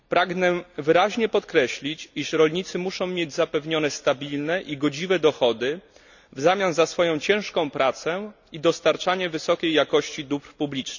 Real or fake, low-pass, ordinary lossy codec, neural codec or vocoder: real; 7.2 kHz; none; none